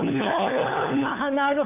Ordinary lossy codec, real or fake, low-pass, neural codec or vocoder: none; fake; 3.6 kHz; codec, 16 kHz, 4 kbps, FunCodec, trained on LibriTTS, 50 frames a second